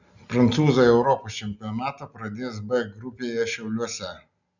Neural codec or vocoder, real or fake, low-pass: none; real; 7.2 kHz